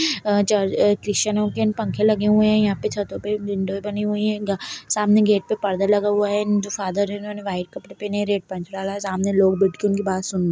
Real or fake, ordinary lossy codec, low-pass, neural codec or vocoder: real; none; none; none